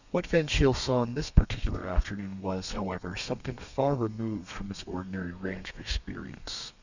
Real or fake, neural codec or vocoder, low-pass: fake; codec, 32 kHz, 1.9 kbps, SNAC; 7.2 kHz